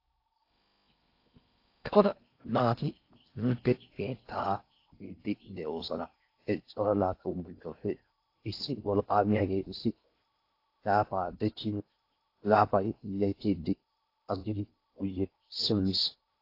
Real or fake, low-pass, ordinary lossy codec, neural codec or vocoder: fake; 5.4 kHz; AAC, 32 kbps; codec, 16 kHz in and 24 kHz out, 0.6 kbps, FocalCodec, streaming, 4096 codes